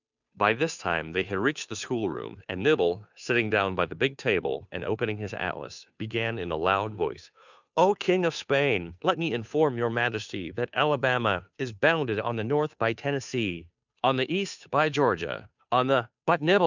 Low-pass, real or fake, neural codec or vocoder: 7.2 kHz; fake; codec, 16 kHz, 2 kbps, FunCodec, trained on Chinese and English, 25 frames a second